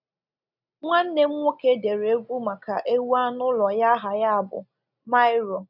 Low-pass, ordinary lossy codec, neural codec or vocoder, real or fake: 5.4 kHz; none; none; real